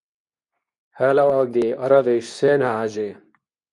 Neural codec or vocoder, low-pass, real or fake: codec, 24 kHz, 0.9 kbps, WavTokenizer, medium speech release version 2; 10.8 kHz; fake